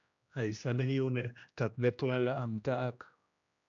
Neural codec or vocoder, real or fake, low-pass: codec, 16 kHz, 1 kbps, X-Codec, HuBERT features, trained on general audio; fake; 7.2 kHz